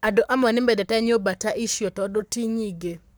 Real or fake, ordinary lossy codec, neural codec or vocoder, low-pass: fake; none; codec, 44.1 kHz, 7.8 kbps, Pupu-Codec; none